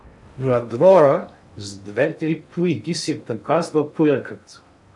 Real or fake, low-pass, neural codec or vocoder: fake; 10.8 kHz; codec, 16 kHz in and 24 kHz out, 0.6 kbps, FocalCodec, streaming, 2048 codes